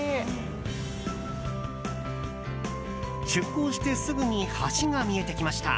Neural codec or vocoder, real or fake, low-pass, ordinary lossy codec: none; real; none; none